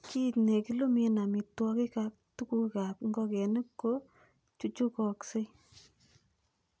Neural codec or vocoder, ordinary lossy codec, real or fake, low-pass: none; none; real; none